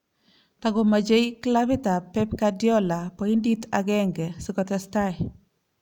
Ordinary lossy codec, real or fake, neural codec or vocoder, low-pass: none; real; none; 19.8 kHz